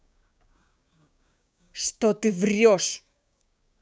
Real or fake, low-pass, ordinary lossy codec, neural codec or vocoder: fake; none; none; codec, 16 kHz, 6 kbps, DAC